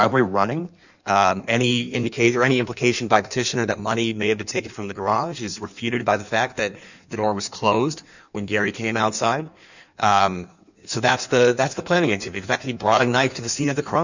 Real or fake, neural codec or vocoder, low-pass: fake; codec, 16 kHz in and 24 kHz out, 1.1 kbps, FireRedTTS-2 codec; 7.2 kHz